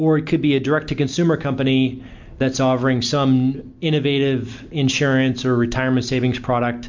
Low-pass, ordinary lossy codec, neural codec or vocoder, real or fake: 7.2 kHz; MP3, 64 kbps; none; real